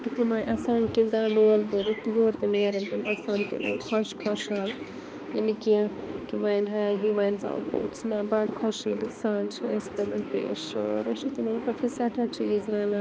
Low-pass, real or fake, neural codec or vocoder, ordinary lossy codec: none; fake; codec, 16 kHz, 2 kbps, X-Codec, HuBERT features, trained on balanced general audio; none